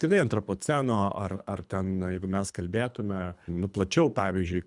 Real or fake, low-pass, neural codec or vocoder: fake; 10.8 kHz; codec, 24 kHz, 3 kbps, HILCodec